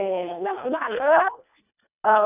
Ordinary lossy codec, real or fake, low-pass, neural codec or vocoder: none; fake; 3.6 kHz; codec, 24 kHz, 1.5 kbps, HILCodec